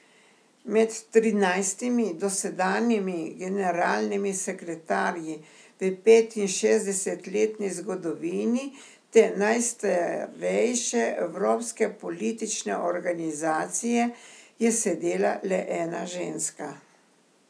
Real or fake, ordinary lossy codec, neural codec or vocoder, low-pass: real; none; none; none